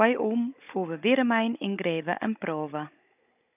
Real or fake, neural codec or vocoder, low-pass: fake; codec, 16 kHz, 16 kbps, FunCodec, trained on Chinese and English, 50 frames a second; 3.6 kHz